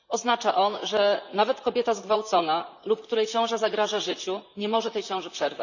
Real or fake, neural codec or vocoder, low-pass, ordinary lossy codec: fake; vocoder, 44.1 kHz, 128 mel bands, Pupu-Vocoder; 7.2 kHz; none